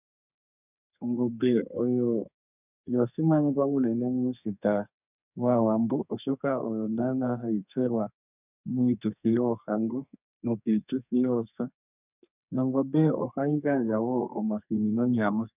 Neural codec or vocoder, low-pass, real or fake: codec, 32 kHz, 1.9 kbps, SNAC; 3.6 kHz; fake